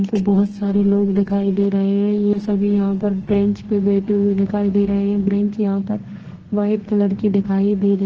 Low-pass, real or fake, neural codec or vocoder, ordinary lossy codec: 7.2 kHz; fake; codec, 32 kHz, 1.9 kbps, SNAC; Opus, 16 kbps